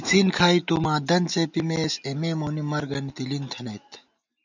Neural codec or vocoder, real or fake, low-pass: none; real; 7.2 kHz